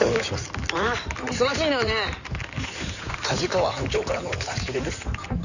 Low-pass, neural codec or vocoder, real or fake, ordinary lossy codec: 7.2 kHz; codec, 16 kHz in and 24 kHz out, 2.2 kbps, FireRedTTS-2 codec; fake; none